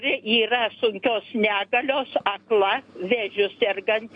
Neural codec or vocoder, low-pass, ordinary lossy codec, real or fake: none; 9.9 kHz; AAC, 48 kbps; real